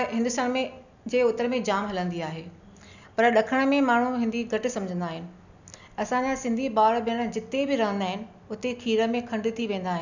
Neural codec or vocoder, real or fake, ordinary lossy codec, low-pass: none; real; none; 7.2 kHz